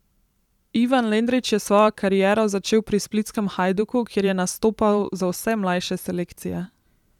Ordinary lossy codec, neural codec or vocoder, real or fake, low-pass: none; vocoder, 44.1 kHz, 128 mel bands every 512 samples, BigVGAN v2; fake; 19.8 kHz